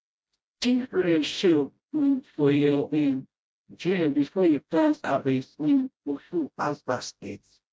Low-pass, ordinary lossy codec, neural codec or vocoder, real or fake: none; none; codec, 16 kHz, 0.5 kbps, FreqCodec, smaller model; fake